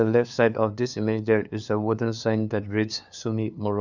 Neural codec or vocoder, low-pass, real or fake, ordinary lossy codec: codec, 16 kHz, 2 kbps, FunCodec, trained on LibriTTS, 25 frames a second; 7.2 kHz; fake; none